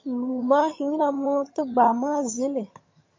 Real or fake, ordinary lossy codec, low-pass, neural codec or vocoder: fake; MP3, 32 kbps; 7.2 kHz; vocoder, 22.05 kHz, 80 mel bands, HiFi-GAN